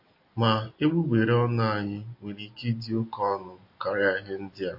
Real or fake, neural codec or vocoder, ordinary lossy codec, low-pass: real; none; MP3, 32 kbps; 5.4 kHz